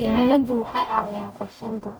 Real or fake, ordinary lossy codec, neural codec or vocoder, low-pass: fake; none; codec, 44.1 kHz, 0.9 kbps, DAC; none